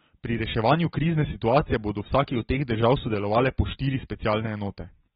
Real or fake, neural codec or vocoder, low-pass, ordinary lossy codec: real; none; 19.8 kHz; AAC, 16 kbps